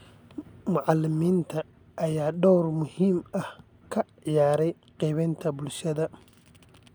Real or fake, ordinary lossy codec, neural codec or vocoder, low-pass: real; none; none; none